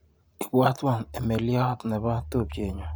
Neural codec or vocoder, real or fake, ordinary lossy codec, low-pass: vocoder, 44.1 kHz, 128 mel bands every 512 samples, BigVGAN v2; fake; none; none